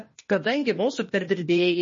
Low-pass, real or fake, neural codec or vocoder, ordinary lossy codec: 7.2 kHz; fake; codec, 16 kHz, 0.8 kbps, ZipCodec; MP3, 32 kbps